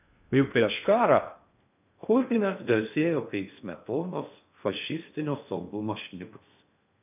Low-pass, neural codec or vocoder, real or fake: 3.6 kHz; codec, 16 kHz in and 24 kHz out, 0.6 kbps, FocalCodec, streaming, 2048 codes; fake